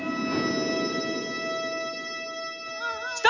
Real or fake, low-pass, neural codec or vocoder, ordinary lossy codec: real; 7.2 kHz; none; none